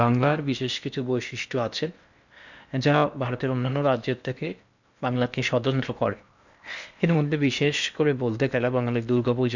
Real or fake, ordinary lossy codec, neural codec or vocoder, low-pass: fake; none; codec, 16 kHz in and 24 kHz out, 0.8 kbps, FocalCodec, streaming, 65536 codes; 7.2 kHz